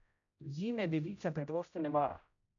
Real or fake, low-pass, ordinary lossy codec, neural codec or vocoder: fake; 7.2 kHz; none; codec, 16 kHz, 0.5 kbps, X-Codec, HuBERT features, trained on general audio